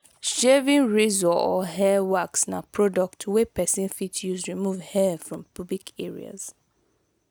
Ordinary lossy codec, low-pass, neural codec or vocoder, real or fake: none; none; none; real